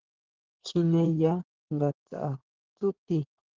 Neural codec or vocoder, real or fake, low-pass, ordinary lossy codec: codec, 16 kHz in and 24 kHz out, 2.2 kbps, FireRedTTS-2 codec; fake; 7.2 kHz; Opus, 16 kbps